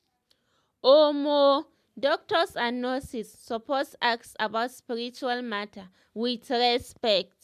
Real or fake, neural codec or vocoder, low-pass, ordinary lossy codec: real; none; 14.4 kHz; MP3, 96 kbps